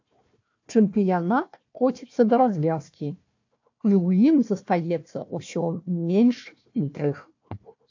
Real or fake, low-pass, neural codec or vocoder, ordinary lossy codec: fake; 7.2 kHz; codec, 16 kHz, 1 kbps, FunCodec, trained on Chinese and English, 50 frames a second; AAC, 48 kbps